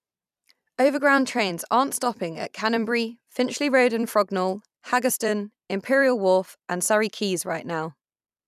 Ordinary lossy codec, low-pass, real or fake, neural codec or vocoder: none; 14.4 kHz; fake; vocoder, 44.1 kHz, 128 mel bands every 256 samples, BigVGAN v2